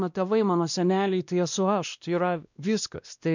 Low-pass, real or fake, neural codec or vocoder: 7.2 kHz; fake; codec, 16 kHz, 1 kbps, X-Codec, WavLM features, trained on Multilingual LibriSpeech